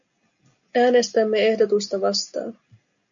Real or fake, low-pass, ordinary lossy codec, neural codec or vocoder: real; 7.2 kHz; MP3, 96 kbps; none